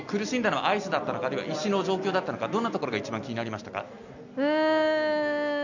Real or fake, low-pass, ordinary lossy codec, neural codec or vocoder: real; 7.2 kHz; none; none